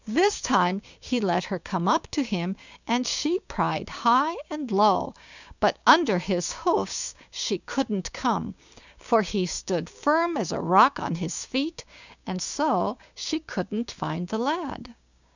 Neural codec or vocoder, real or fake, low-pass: codec, 16 kHz, 6 kbps, DAC; fake; 7.2 kHz